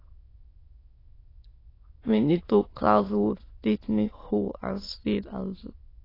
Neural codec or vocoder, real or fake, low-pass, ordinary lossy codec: autoencoder, 22.05 kHz, a latent of 192 numbers a frame, VITS, trained on many speakers; fake; 5.4 kHz; AAC, 24 kbps